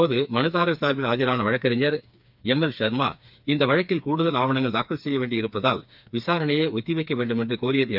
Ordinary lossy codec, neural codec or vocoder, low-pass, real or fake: none; codec, 16 kHz, 4 kbps, FreqCodec, smaller model; 5.4 kHz; fake